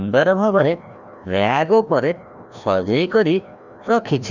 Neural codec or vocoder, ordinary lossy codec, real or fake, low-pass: codec, 16 kHz, 1 kbps, FreqCodec, larger model; none; fake; 7.2 kHz